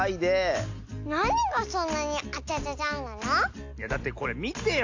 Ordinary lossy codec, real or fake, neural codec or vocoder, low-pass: AAC, 48 kbps; real; none; 7.2 kHz